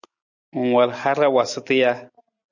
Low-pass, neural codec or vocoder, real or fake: 7.2 kHz; none; real